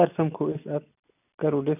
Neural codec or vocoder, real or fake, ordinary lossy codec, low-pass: none; real; none; 3.6 kHz